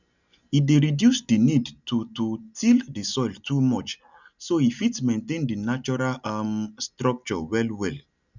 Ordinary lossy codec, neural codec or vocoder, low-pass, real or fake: none; none; 7.2 kHz; real